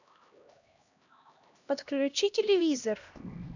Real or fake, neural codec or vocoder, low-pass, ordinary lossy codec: fake; codec, 16 kHz, 1 kbps, X-Codec, HuBERT features, trained on LibriSpeech; 7.2 kHz; none